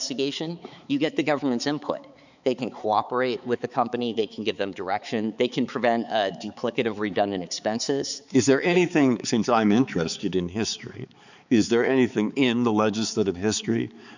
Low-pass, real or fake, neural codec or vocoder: 7.2 kHz; fake; codec, 16 kHz, 4 kbps, X-Codec, HuBERT features, trained on balanced general audio